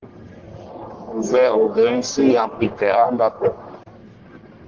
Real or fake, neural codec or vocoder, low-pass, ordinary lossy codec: fake; codec, 44.1 kHz, 1.7 kbps, Pupu-Codec; 7.2 kHz; Opus, 16 kbps